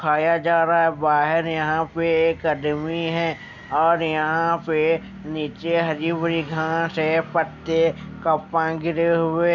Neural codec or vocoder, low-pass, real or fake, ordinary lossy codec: none; 7.2 kHz; real; none